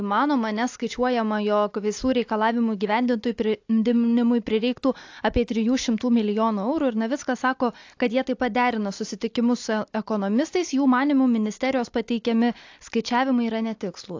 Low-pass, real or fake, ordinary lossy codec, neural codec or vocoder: 7.2 kHz; real; AAC, 48 kbps; none